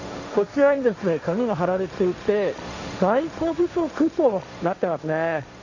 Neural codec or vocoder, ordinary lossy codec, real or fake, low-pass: codec, 16 kHz, 1.1 kbps, Voila-Tokenizer; none; fake; 7.2 kHz